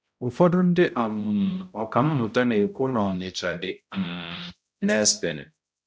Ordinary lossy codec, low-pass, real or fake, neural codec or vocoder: none; none; fake; codec, 16 kHz, 0.5 kbps, X-Codec, HuBERT features, trained on balanced general audio